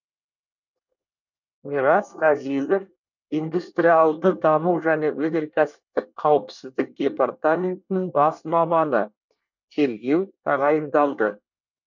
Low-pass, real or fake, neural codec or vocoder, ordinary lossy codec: 7.2 kHz; fake; codec, 24 kHz, 1 kbps, SNAC; none